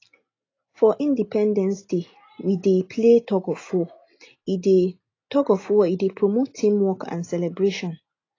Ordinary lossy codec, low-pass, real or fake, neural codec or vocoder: AAC, 32 kbps; 7.2 kHz; real; none